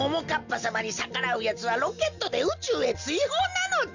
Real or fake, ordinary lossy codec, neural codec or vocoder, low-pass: real; Opus, 64 kbps; none; 7.2 kHz